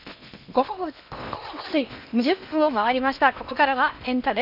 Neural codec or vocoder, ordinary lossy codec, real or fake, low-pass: codec, 16 kHz in and 24 kHz out, 0.8 kbps, FocalCodec, streaming, 65536 codes; none; fake; 5.4 kHz